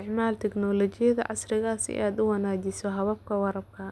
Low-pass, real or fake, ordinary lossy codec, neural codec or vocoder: none; real; none; none